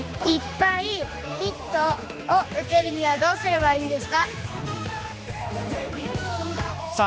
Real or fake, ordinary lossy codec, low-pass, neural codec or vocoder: fake; none; none; codec, 16 kHz, 2 kbps, X-Codec, HuBERT features, trained on general audio